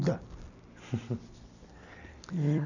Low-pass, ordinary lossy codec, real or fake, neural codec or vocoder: 7.2 kHz; none; fake; vocoder, 44.1 kHz, 128 mel bands every 256 samples, BigVGAN v2